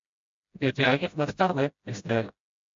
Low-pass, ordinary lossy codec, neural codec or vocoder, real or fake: 7.2 kHz; MP3, 48 kbps; codec, 16 kHz, 0.5 kbps, FreqCodec, smaller model; fake